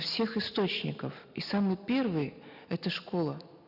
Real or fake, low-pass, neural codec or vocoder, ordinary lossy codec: real; 5.4 kHz; none; none